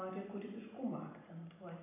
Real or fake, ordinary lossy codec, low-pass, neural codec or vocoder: real; AAC, 32 kbps; 3.6 kHz; none